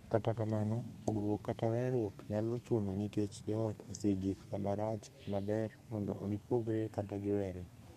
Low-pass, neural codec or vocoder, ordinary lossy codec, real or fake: 14.4 kHz; codec, 32 kHz, 1.9 kbps, SNAC; MP3, 64 kbps; fake